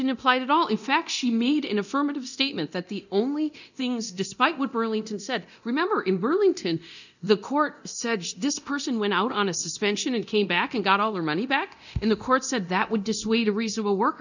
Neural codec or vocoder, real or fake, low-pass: codec, 24 kHz, 0.9 kbps, DualCodec; fake; 7.2 kHz